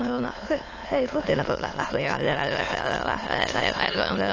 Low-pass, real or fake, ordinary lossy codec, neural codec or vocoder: 7.2 kHz; fake; AAC, 32 kbps; autoencoder, 22.05 kHz, a latent of 192 numbers a frame, VITS, trained on many speakers